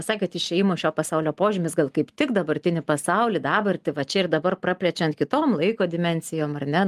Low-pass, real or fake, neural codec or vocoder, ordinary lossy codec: 14.4 kHz; real; none; Opus, 32 kbps